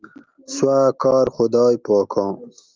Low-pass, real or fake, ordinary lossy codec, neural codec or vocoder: 7.2 kHz; real; Opus, 32 kbps; none